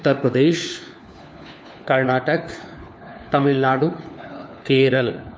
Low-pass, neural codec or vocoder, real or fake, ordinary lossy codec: none; codec, 16 kHz, 4 kbps, FunCodec, trained on LibriTTS, 50 frames a second; fake; none